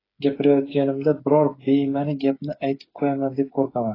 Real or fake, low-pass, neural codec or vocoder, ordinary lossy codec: fake; 5.4 kHz; codec, 16 kHz, 8 kbps, FreqCodec, smaller model; AAC, 24 kbps